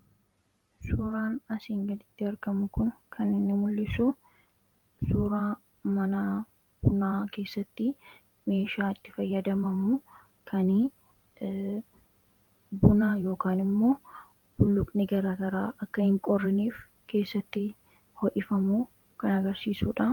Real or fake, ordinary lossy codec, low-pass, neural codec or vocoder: fake; Opus, 32 kbps; 19.8 kHz; vocoder, 44.1 kHz, 128 mel bands every 512 samples, BigVGAN v2